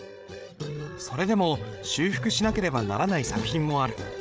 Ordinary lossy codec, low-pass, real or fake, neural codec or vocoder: none; none; fake; codec, 16 kHz, 8 kbps, FreqCodec, larger model